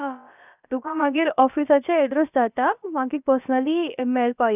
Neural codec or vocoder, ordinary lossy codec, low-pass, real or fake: codec, 16 kHz, about 1 kbps, DyCAST, with the encoder's durations; none; 3.6 kHz; fake